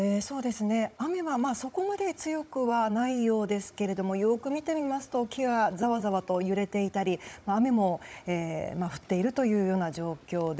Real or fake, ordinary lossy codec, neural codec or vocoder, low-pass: fake; none; codec, 16 kHz, 16 kbps, FunCodec, trained on Chinese and English, 50 frames a second; none